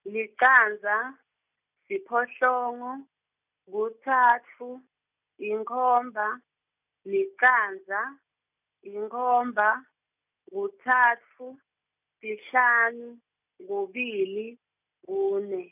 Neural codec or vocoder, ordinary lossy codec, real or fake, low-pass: none; none; real; 3.6 kHz